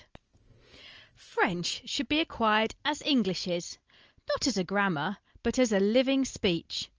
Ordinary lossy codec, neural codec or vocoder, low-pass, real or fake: Opus, 24 kbps; none; 7.2 kHz; real